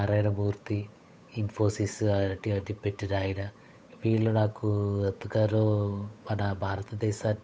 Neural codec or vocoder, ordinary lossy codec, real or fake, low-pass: codec, 16 kHz, 8 kbps, FunCodec, trained on Chinese and English, 25 frames a second; none; fake; none